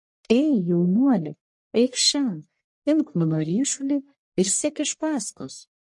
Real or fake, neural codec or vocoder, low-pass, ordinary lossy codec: fake; codec, 44.1 kHz, 1.7 kbps, Pupu-Codec; 10.8 kHz; MP3, 48 kbps